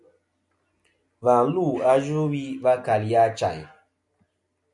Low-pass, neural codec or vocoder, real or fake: 10.8 kHz; none; real